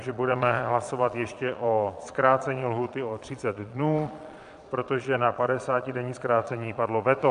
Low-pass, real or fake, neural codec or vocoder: 9.9 kHz; fake; vocoder, 22.05 kHz, 80 mel bands, Vocos